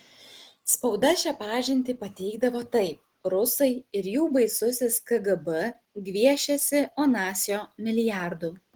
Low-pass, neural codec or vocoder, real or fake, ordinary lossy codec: 19.8 kHz; none; real; Opus, 16 kbps